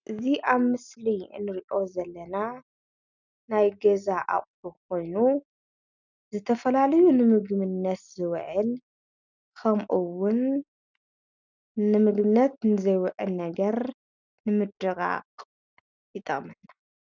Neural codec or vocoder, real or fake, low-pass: none; real; 7.2 kHz